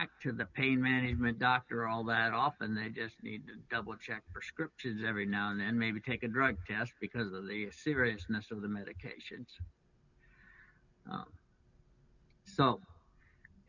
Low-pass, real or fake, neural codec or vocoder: 7.2 kHz; real; none